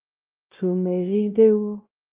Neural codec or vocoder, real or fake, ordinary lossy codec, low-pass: codec, 16 kHz, 0.5 kbps, X-Codec, WavLM features, trained on Multilingual LibriSpeech; fake; Opus, 24 kbps; 3.6 kHz